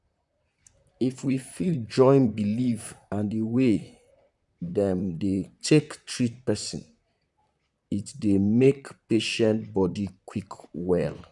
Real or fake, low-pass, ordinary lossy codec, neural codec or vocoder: fake; 10.8 kHz; none; vocoder, 44.1 kHz, 128 mel bands, Pupu-Vocoder